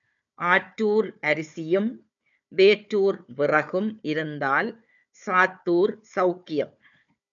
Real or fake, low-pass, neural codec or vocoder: fake; 7.2 kHz; codec, 16 kHz, 4 kbps, FunCodec, trained on Chinese and English, 50 frames a second